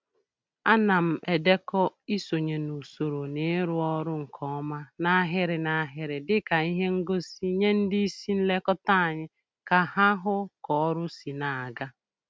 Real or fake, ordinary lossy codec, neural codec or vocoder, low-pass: real; none; none; none